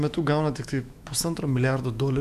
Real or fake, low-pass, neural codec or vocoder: fake; 14.4 kHz; autoencoder, 48 kHz, 128 numbers a frame, DAC-VAE, trained on Japanese speech